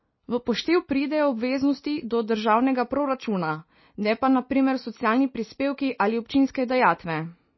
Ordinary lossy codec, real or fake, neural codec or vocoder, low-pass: MP3, 24 kbps; real; none; 7.2 kHz